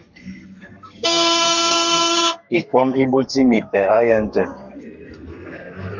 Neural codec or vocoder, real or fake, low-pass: codec, 32 kHz, 1.9 kbps, SNAC; fake; 7.2 kHz